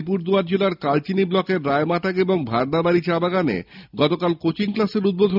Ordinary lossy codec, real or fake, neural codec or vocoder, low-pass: none; real; none; 5.4 kHz